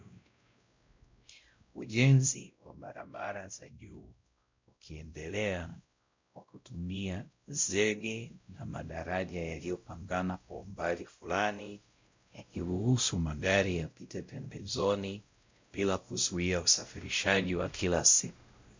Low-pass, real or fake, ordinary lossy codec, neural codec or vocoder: 7.2 kHz; fake; MP3, 64 kbps; codec, 16 kHz, 0.5 kbps, X-Codec, WavLM features, trained on Multilingual LibriSpeech